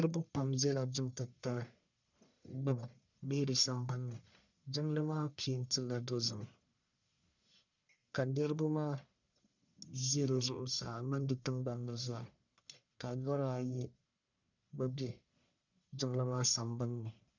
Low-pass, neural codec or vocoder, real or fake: 7.2 kHz; codec, 44.1 kHz, 1.7 kbps, Pupu-Codec; fake